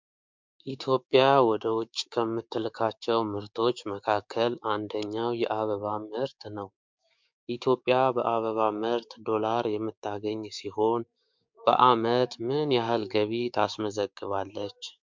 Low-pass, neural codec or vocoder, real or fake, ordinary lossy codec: 7.2 kHz; codec, 16 kHz, 6 kbps, DAC; fake; MP3, 64 kbps